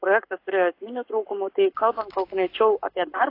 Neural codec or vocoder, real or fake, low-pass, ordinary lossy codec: codec, 44.1 kHz, 7.8 kbps, Pupu-Codec; fake; 5.4 kHz; AAC, 32 kbps